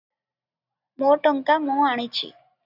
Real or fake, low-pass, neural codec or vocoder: real; 5.4 kHz; none